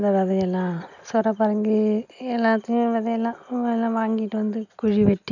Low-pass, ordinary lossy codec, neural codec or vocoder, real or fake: 7.2 kHz; none; none; real